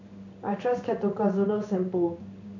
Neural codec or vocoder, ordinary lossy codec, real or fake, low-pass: codec, 16 kHz in and 24 kHz out, 1 kbps, XY-Tokenizer; MP3, 64 kbps; fake; 7.2 kHz